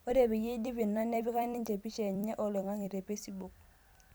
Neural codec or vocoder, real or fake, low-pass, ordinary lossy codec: vocoder, 44.1 kHz, 128 mel bands every 256 samples, BigVGAN v2; fake; none; none